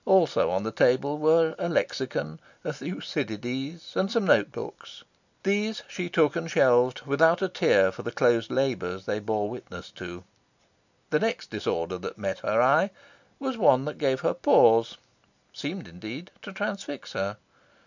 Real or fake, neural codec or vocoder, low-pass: real; none; 7.2 kHz